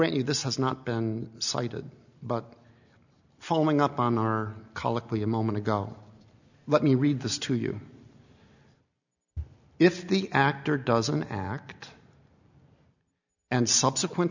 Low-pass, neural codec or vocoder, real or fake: 7.2 kHz; none; real